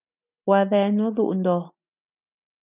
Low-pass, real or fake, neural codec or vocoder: 3.6 kHz; real; none